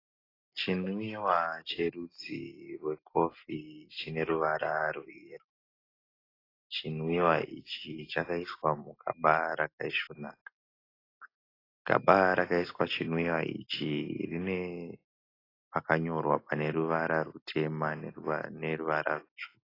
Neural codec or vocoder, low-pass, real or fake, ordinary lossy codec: none; 5.4 kHz; real; AAC, 24 kbps